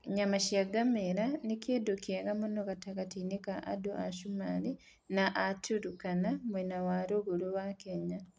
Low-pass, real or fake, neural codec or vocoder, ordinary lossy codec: none; real; none; none